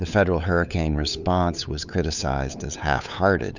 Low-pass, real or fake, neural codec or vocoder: 7.2 kHz; fake; codec, 16 kHz, 8 kbps, FunCodec, trained on LibriTTS, 25 frames a second